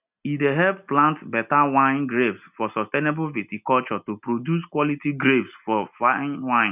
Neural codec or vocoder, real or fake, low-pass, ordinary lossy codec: none; real; 3.6 kHz; none